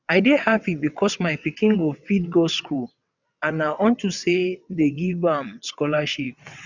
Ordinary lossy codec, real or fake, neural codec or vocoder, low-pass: none; fake; vocoder, 22.05 kHz, 80 mel bands, WaveNeXt; 7.2 kHz